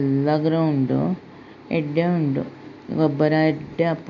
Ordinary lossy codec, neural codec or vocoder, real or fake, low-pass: MP3, 64 kbps; none; real; 7.2 kHz